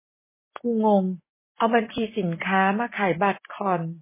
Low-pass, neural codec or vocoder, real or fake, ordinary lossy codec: 3.6 kHz; none; real; MP3, 16 kbps